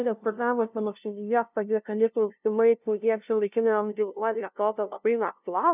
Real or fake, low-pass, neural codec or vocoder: fake; 3.6 kHz; codec, 16 kHz, 0.5 kbps, FunCodec, trained on LibriTTS, 25 frames a second